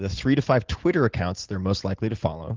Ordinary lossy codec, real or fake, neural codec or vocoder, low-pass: Opus, 16 kbps; real; none; 7.2 kHz